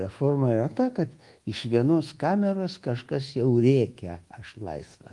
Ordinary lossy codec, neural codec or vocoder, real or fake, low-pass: Opus, 24 kbps; codec, 24 kHz, 1.2 kbps, DualCodec; fake; 10.8 kHz